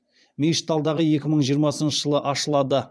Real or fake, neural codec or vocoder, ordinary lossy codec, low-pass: fake; vocoder, 22.05 kHz, 80 mel bands, Vocos; none; none